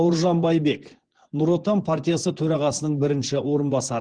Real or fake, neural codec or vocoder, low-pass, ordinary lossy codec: fake; vocoder, 24 kHz, 100 mel bands, Vocos; 9.9 kHz; Opus, 16 kbps